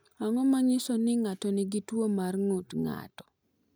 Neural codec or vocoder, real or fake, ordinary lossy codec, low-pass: none; real; none; none